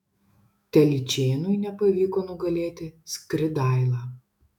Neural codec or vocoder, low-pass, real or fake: autoencoder, 48 kHz, 128 numbers a frame, DAC-VAE, trained on Japanese speech; 19.8 kHz; fake